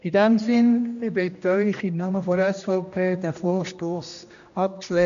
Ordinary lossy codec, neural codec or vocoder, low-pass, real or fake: AAC, 96 kbps; codec, 16 kHz, 1 kbps, X-Codec, HuBERT features, trained on general audio; 7.2 kHz; fake